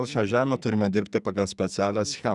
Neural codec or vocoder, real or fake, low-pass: codec, 44.1 kHz, 2.6 kbps, SNAC; fake; 10.8 kHz